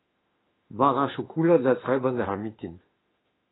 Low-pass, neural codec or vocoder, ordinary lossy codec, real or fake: 7.2 kHz; autoencoder, 48 kHz, 32 numbers a frame, DAC-VAE, trained on Japanese speech; AAC, 16 kbps; fake